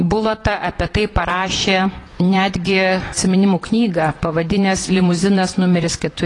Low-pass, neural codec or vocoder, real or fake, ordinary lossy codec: 10.8 kHz; vocoder, 44.1 kHz, 128 mel bands, Pupu-Vocoder; fake; AAC, 32 kbps